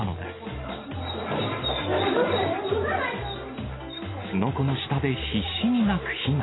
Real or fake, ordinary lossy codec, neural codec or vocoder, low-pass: real; AAC, 16 kbps; none; 7.2 kHz